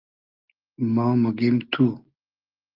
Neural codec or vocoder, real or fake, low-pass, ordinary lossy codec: none; real; 5.4 kHz; Opus, 32 kbps